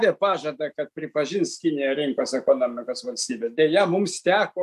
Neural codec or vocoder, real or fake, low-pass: none; real; 14.4 kHz